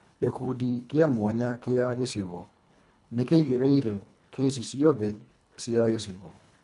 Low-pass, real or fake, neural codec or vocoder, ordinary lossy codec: 10.8 kHz; fake; codec, 24 kHz, 1.5 kbps, HILCodec; none